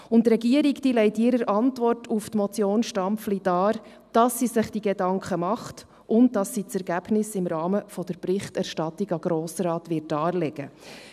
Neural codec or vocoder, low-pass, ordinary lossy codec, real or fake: none; 14.4 kHz; none; real